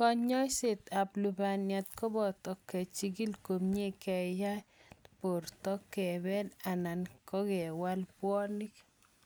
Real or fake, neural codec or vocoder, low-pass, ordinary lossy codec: real; none; none; none